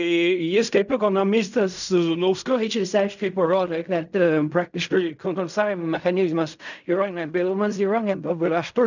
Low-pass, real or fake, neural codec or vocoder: 7.2 kHz; fake; codec, 16 kHz in and 24 kHz out, 0.4 kbps, LongCat-Audio-Codec, fine tuned four codebook decoder